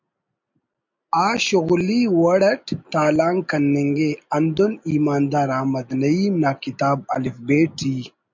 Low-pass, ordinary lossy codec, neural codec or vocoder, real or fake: 7.2 kHz; MP3, 64 kbps; none; real